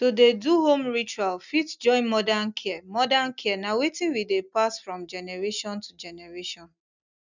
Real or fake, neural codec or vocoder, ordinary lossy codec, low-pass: real; none; none; 7.2 kHz